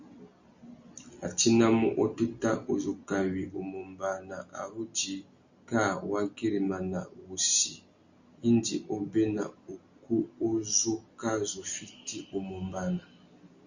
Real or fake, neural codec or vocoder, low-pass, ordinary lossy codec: real; none; 7.2 kHz; Opus, 64 kbps